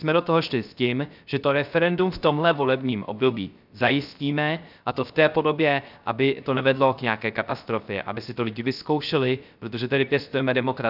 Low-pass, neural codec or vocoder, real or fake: 5.4 kHz; codec, 16 kHz, 0.3 kbps, FocalCodec; fake